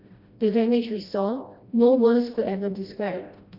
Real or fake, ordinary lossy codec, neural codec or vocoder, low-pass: fake; Opus, 64 kbps; codec, 16 kHz, 1 kbps, FreqCodec, smaller model; 5.4 kHz